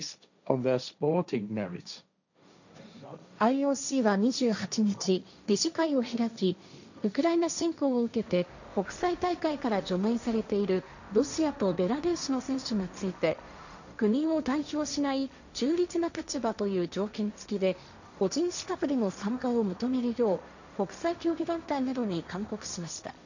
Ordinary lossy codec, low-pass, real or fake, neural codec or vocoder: none; 7.2 kHz; fake; codec, 16 kHz, 1.1 kbps, Voila-Tokenizer